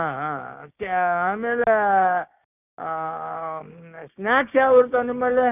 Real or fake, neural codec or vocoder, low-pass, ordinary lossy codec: fake; codec, 16 kHz, 6 kbps, DAC; 3.6 kHz; none